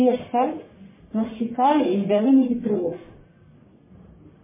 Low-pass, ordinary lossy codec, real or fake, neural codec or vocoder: 3.6 kHz; MP3, 16 kbps; fake; codec, 44.1 kHz, 1.7 kbps, Pupu-Codec